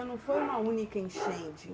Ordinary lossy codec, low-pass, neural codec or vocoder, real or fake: none; none; none; real